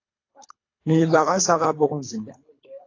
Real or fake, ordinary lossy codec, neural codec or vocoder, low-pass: fake; AAC, 32 kbps; codec, 24 kHz, 3 kbps, HILCodec; 7.2 kHz